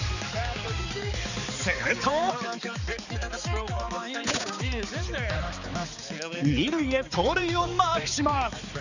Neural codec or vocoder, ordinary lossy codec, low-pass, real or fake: codec, 16 kHz, 4 kbps, X-Codec, HuBERT features, trained on balanced general audio; none; 7.2 kHz; fake